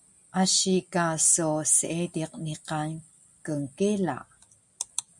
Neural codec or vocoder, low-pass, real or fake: none; 10.8 kHz; real